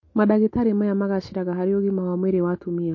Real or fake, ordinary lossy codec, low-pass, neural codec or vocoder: real; MP3, 32 kbps; 7.2 kHz; none